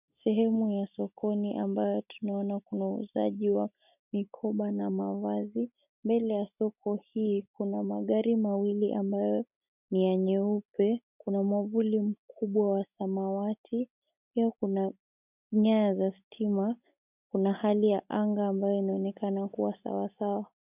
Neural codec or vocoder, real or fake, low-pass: none; real; 3.6 kHz